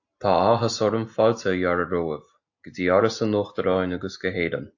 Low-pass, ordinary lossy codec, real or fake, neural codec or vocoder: 7.2 kHz; AAC, 48 kbps; real; none